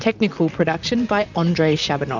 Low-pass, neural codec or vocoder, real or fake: 7.2 kHz; vocoder, 44.1 kHz, 128 mel bands, Pupu-Vocoder; fake